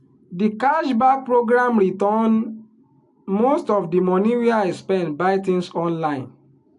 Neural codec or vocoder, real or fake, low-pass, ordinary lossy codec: none; real; 10.8 kHz; AAC, 64 kbps